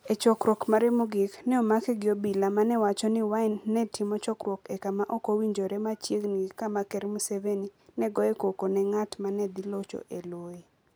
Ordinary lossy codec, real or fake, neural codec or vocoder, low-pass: none; real; none; none